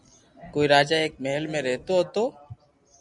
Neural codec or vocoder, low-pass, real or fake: none; 10.8 kHz; real